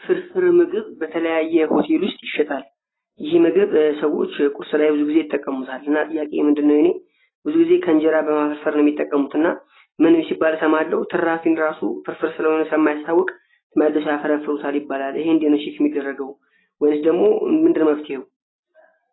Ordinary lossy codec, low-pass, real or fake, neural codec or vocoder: AAC, 16 kbps; 7.2 kHz; real; none